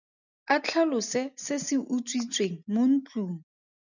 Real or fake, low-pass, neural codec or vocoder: real; 7.2 kHz; none